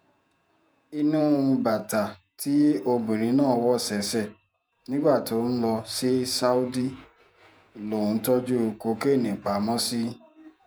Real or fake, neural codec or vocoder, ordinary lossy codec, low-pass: fake; vocoder, 48 kHz, 128 mel bands, Vocos; none; none